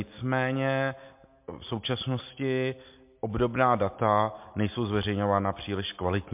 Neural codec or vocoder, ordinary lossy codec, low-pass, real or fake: none; MP3, 32 kbps; 3.6 kHz; real